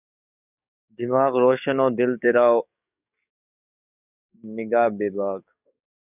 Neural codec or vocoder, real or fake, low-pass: codec, 44.1 kHz, 7.8 kbps, DAC; fake; 3.6 kHz